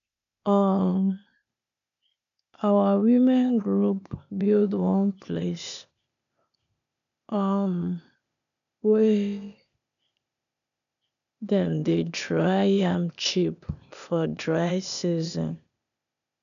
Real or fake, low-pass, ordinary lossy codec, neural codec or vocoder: fake; 7.2 kHz; none; codec, 16 kHz, 0.8 kbps, ZipCodec